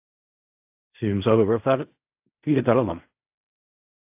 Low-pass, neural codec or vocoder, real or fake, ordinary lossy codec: 3.6 kHz; codec, 16 kHz in and 24 kHz out, 0.4 kbps, LongCat-Audio-Codec, fine tuned four codebook decoder; fake; AAC, 32 kbps